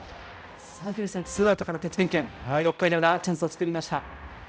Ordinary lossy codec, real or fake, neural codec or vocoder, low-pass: none; fake; codec, 16 kHz, 0.5 kbps, X-Codec, HuBERT features, trained on balanced general audio; none